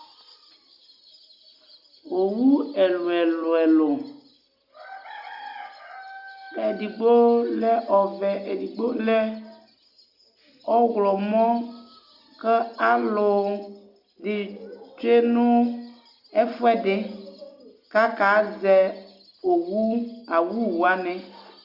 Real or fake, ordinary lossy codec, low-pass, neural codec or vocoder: real; Opus, 64 kbps; 5.4 kHz; none